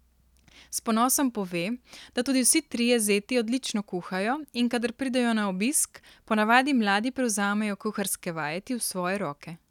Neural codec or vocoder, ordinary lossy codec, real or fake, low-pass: none; none; real; 19.8 kHz